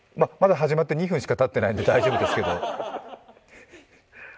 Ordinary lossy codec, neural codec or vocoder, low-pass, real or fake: none; none; none; real